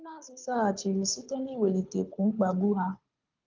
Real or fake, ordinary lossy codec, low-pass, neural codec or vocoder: fake; Opus, 32 kbps; 7.2 kHz; codec, 24 kHz, 6 kbps, HILCodec